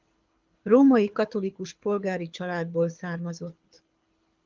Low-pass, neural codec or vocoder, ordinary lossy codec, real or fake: 7.2 kHz; codec, 24 kHz, 6 kbps, HILCodec; Opus, 24 kbps; fake